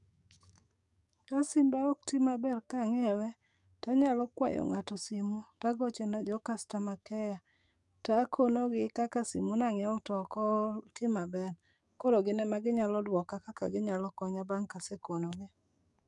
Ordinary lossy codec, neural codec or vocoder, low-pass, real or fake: none; codec, 44.1 kHz, 7.8 kbps, DAC; 10.8 kHz; fake